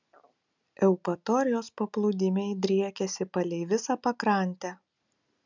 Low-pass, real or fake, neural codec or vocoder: 7.2 kHz; real; none